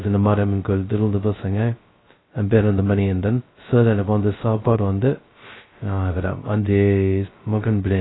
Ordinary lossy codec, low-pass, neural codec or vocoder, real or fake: AAC, 16 kbps; 7.2 kHz; codec, 16 kHz, 0.2 kbps, FocalCodec; fake